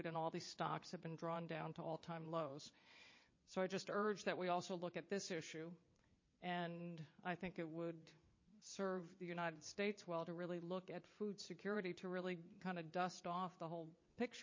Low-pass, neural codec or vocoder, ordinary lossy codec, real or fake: 7.2 kHz; vocoder, 22.05 kHz, 80 mel bands, WaveNeXt; MP3, 32 kbps; fake